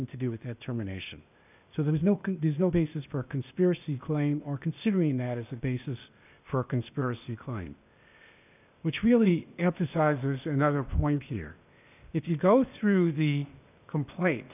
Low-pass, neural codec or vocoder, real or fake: 3.6 kHz; codec, 16 kHz, 0.8 kbps, ZipCodec; fake